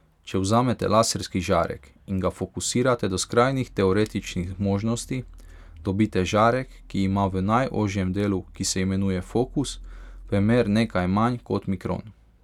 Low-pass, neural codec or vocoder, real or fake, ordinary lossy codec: 19.8 kHz; none; real; none